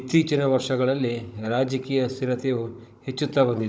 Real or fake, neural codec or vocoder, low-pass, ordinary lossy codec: fake; codec, 16 kHz, 16 kbps, FunCodec, trained on Chinese and English, 50 frames a second; none; none